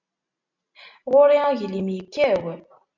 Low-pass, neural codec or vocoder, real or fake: 7.2 kHz; none; real